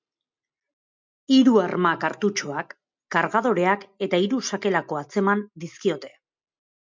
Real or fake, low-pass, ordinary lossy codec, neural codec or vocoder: real; 7.2 kHz; MP3, 64 kbps; none